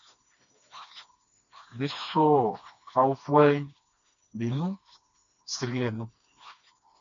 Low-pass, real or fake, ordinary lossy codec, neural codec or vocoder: 7.2 kHz; fake; MP3, 64 kbps; codec, 16 kHz, 2 kbps, FreqCodec, smaller model